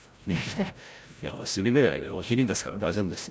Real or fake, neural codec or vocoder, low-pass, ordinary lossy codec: fake; codec, 16 kHz, 0.5 kbps, FreqCodec, larger model; none; none